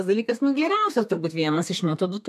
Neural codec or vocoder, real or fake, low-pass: codec, 32 kHz, 1.9 kbps, SNAC; fake; 14.4 kHz